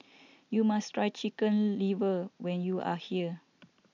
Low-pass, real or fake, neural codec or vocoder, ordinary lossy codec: 7.2 kHz; real; none; none